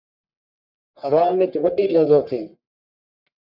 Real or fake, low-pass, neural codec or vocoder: fake; 5.4 kHz; codec, 44.1 kHz, 1.7 kbps, Pupu-Codec